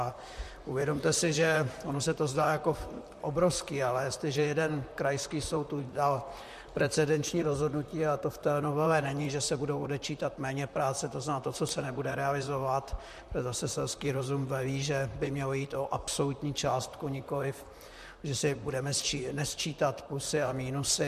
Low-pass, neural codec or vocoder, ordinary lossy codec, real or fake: 14.4 kHz; vocoder, 44.1 kHz, 128 mel bands, Pupu-Vocoder; MP3, 64 kbps; fake